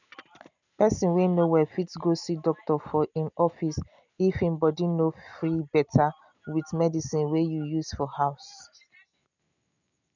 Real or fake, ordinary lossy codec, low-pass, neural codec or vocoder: real; none; 7.2 kHz; none